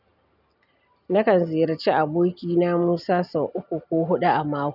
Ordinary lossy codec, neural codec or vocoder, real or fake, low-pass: none; none; real; 5.4 kHz